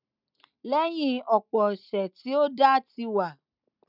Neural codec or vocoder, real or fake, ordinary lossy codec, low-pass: none; real; none; 5.4 kHz